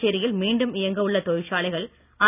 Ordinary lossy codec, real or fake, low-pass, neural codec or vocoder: none; real; 3.6 kHz; none